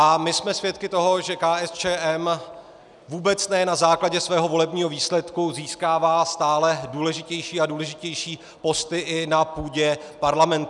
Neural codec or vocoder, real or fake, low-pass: none; real; 10.8 kHz